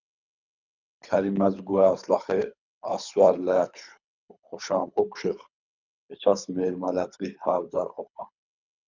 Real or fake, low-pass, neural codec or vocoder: fake; 7.2 kHz; codec, 24 kHz, 3 kbps, HILCodec